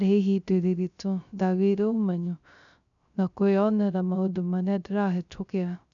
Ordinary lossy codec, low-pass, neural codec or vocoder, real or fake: none; 7.2 kHz; codec, 16 kHz, 0.3 kbps, FocalCodec; fake